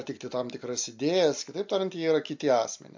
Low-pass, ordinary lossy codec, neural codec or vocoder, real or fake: 7.2 kHz; MP3, 48 kbps; none; real